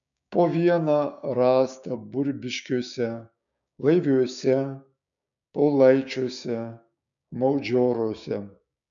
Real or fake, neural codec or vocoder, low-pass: fake; codec, 16 kHz, 6 kbps, DAC; 7.2 kHz